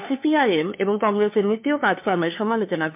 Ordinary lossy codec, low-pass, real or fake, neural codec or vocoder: MP3, 32 kbps; 3.6 kHz; fake; codec, 16 kHz, 2 kbps, FunCodec, trained on LibriTTS, 25 frames a second